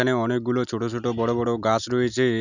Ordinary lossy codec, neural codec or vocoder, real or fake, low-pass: none; none; real; 7.2 kHz